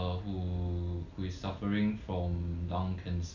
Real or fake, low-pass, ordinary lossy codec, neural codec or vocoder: real; 7.2 kHz; none; none